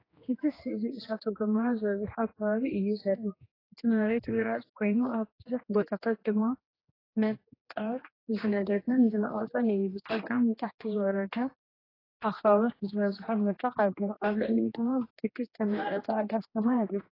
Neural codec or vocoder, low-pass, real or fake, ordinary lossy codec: codec, 16 kHz, 2 kbps, X-Codec, HuBERT features, trained on general audio; 5.4 kHz; fake; AAC, 24 kbps